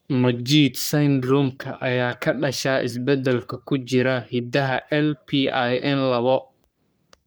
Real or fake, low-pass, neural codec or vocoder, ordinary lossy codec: fake; none; codec, 44.1 kHz, 3.4 kbps, Pupu-Codec; none